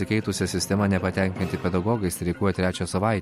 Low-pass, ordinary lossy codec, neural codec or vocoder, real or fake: 19.8 kHz; MP3, 64 kbps; none; real